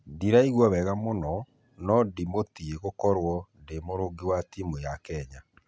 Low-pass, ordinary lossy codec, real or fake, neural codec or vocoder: none; none; real; none